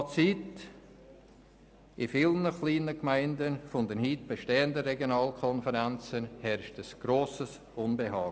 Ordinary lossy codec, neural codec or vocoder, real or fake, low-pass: none; none; real; none